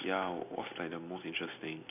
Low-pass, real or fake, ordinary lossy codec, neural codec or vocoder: 3.6 kHz; real; none; none